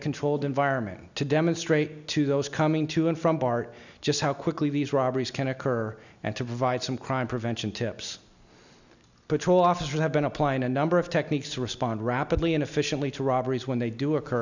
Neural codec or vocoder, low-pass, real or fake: none; 7.2 kHz; real